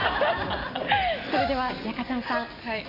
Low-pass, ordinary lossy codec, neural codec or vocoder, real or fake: 5.4 kHz; none; none; real